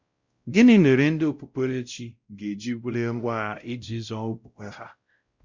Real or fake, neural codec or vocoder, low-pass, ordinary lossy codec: fake; codec, 16 kHz, 0.5 kbps, X-Codec, WavLM features, trained on Multilingual LibriSpeech; 7.2 kHz; Opus, 64 kbps